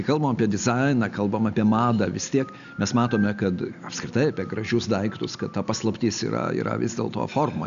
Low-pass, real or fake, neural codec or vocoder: 7.2 kHz; real; none